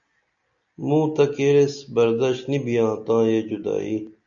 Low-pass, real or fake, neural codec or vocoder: 7.2 kHz; real; none